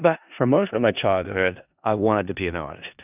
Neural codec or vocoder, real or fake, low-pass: codec, 16 kHz in and 24 kHz out, 0.4 kbps, LongCat-Audio-Codec, four codebook decoder; fake; 3.6 kHz